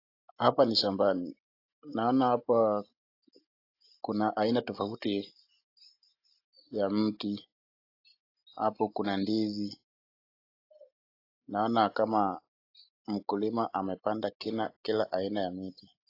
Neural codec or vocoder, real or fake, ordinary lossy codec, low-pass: none; real; AAC, 32 kbps; 5.4 kHz